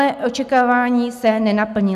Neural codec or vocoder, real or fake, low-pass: none; real; 14.4 kHz